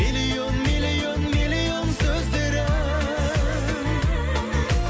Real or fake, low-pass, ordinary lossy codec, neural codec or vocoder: real; none; none; none